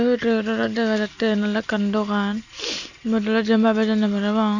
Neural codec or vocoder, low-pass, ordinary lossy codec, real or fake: none; 7.2 kHz; none; real